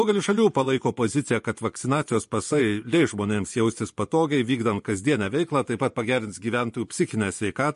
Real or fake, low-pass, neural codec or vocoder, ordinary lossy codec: fake; 10.8 kHz; vocoder, 24 kHz, 100 mel bands, Vocos; MP3, 48 kbps